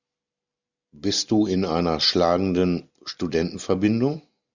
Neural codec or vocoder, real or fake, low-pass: none; real; 7.2 kHz